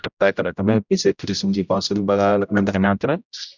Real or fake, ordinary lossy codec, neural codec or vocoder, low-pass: fake; none; codec, 16 kHz, 0.5 kbps, X-Codec, HuBERT features, trained on general audio; 7.2 kHz